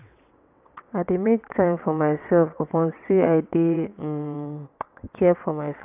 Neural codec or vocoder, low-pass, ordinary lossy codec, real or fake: vocoder, 22.05 kHz, 80 mel bands, WaveNeXt; 3.6 kHz; AAC, 32 kbps; fake